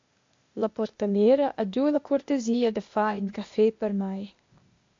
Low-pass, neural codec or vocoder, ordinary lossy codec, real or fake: 7.2 kHz; codec, 16 kHz, 0.8 kbps, ZipCodec; AAC, 48 kbps; fake